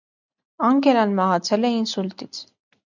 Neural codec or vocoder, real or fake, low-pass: none; real; 7.2 kHz